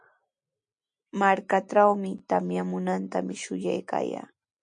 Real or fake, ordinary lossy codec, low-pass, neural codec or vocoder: real; MP3, 48 kbps; 9.9 kHz; none